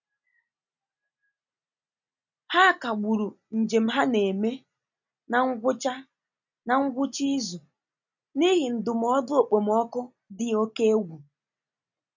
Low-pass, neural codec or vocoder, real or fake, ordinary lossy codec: 7.2 kHz; none; real; none